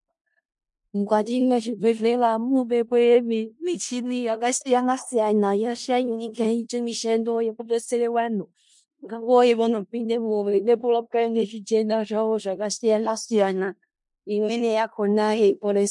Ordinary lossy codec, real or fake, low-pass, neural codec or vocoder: MP3, 64 kbps; fake; 10.8 kHz; codec, 16 kHz in and 24 kHz out, 0.4 kbps, LongCat-Audio-Codec, four codebook decoder